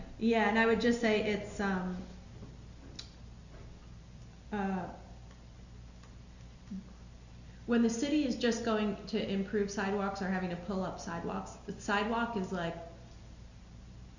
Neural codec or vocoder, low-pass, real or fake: none; 7.2 kHz; real